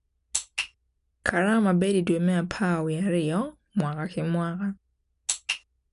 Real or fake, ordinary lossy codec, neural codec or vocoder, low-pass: real; none; none; 10.8 kHz